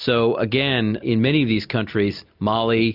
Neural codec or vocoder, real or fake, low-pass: none; real; 5.4 kHz